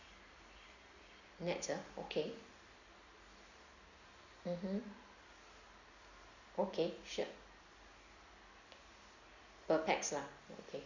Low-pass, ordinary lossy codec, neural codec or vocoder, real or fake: 7.2 kHz; Opus, 64 kbps; none; real